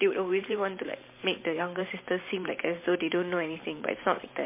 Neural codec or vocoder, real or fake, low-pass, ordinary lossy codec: vocoder, 44.1 kHz, 128 mel bands every 512 samples, BigVGAN v2; fake; 3.6 kHz; MP3, 24 kbps